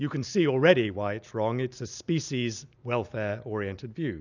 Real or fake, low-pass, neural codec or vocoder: real; 7.2 kHz; none